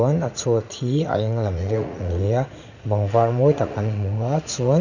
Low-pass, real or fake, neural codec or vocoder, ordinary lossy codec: 7.2 kHz; fake; vocoder, 44.1 kHz, 80 mel bands, Vocos; none